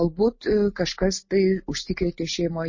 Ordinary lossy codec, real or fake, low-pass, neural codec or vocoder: MP3, 32 kbps; real; 7.2 kHz; none